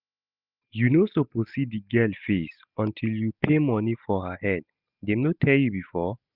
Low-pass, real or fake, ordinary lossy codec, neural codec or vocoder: 5.4 kHz; real; none; none